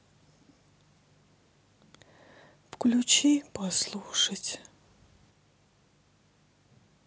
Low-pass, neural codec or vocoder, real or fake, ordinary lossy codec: none; none; real; none